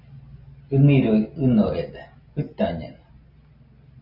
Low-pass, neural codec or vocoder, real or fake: 5.4 kHz; none; real